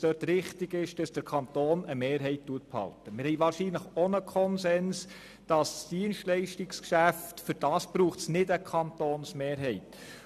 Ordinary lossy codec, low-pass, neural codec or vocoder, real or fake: none; 14.4 kHz; none; real